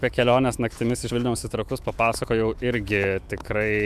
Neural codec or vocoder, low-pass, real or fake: autoencoder, 48 kHz, 128 numbers a frame, DAC-VAE, trained on Japanese speech; 14.4 kHz; fake